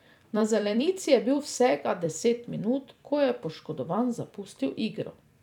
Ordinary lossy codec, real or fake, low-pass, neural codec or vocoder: none; fake; 19.8 kHz; vocoder, 44.1 kHz, 128 mel bands every 256 samples, BigVGAN v2